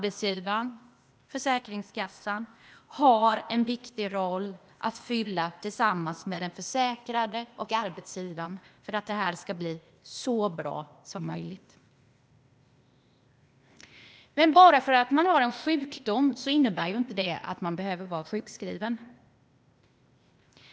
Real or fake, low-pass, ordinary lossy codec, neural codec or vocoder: fake; none; none; codec, 16 kHz, 0.8 kbps, ZipCodec